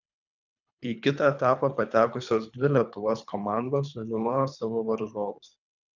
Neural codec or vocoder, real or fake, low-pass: codec, 24 kHz, 3 kbps, HILCodec; fake; 7.2 kHz